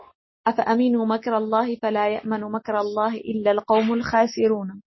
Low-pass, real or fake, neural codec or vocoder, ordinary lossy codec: 7.2 kHz; real; none; MP3, 24 kbps